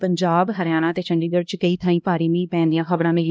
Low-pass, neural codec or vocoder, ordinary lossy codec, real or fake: none; codec, 16 kHz, 1 kbps, X-Codec, HuBERT features, trained on LibriSpeech; none; fake